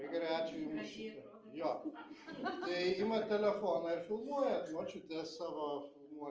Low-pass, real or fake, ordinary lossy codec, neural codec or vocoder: 7.2 kHz; real; Opus, 24 kbps; none